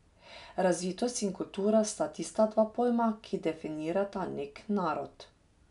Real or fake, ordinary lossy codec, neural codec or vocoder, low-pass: real; none; none; 10.8 kHz